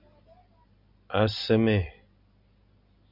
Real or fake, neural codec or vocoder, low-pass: real; none; 5.4 kHz